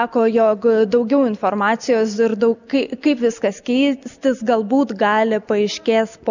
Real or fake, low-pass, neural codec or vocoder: real; 7.2 kHz; none